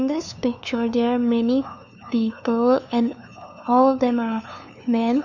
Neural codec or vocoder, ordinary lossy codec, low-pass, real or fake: codec, 16 kHz, 2 kbps, FunCodec, trained on LibriTTS, 25 frames a second; none; 7.2 kHz; fake